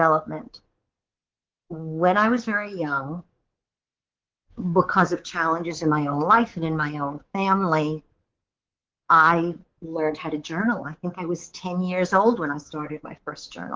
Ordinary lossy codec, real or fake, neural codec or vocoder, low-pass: Opus, 16 kbps; fake; codec, 24 kHz, 3.1 kbps, DualCodec; 7.2 kHz